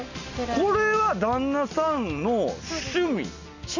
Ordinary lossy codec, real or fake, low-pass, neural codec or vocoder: none; real; 7.2 kHz; none